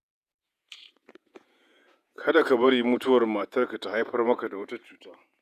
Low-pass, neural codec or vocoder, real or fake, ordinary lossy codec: 14.4 kHz; vocoder, 48 kHz, 128 mel bands, Vocos; fake; none